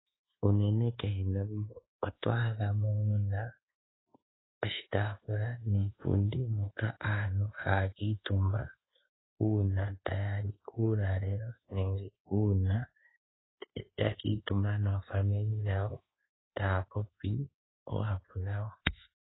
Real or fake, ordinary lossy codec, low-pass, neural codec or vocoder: fake; AAC, 16 kbps; 7.2 kHz; codec, 24 kHz, 1.2 kbps, DualCodec